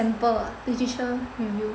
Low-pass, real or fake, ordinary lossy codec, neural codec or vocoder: none; real; none; none